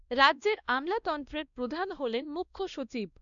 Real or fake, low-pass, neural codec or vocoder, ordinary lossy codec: fake; 7.2 kHz; codec, 16 kHz, 1 kbps, X-Codec, WavLM features, trained on Multilingual LibriSpeech; none